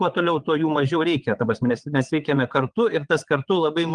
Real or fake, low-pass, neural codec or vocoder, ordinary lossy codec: fake; 9.9 kHz; vocoder, 22.05 kHz, 80 mel bands, WaveNeXt; Opus, 24 kbps